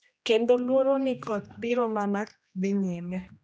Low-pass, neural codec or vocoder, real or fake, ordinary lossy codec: none; codec, 16 kHz, 1 kbps, X-Codec, HuBERT features, trained on general audio; fake; none